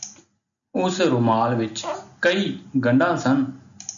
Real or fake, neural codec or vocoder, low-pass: real; none; 7.2 kHz